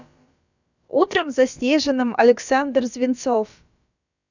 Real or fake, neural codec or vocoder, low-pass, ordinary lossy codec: fake; codec, 16 kHz, about 1 kbps, DyCAST, with the encoder's durations; 7.2 kHz; none